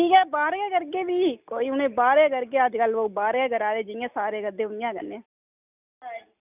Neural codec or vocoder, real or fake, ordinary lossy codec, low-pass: none; real; none; 3.6 kHz